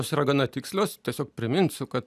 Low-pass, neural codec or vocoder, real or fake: 14.4 kHz; none; real